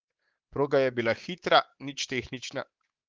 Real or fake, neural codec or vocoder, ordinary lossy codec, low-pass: fake; codec, 24 kHz, 3.1 kbps, DualCodec; Opus, 16 kbps; 7.2 kHz